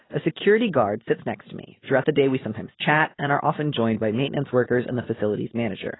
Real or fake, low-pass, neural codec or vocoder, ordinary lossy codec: real; 7.2 kHz; none; AAC, 16 kbps